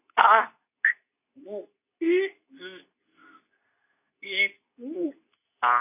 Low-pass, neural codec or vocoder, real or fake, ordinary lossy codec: 3.6 kHz; codec, 24 kHz, 0.9 kbps, WavTokenizer, medium speech release version 2; fake; none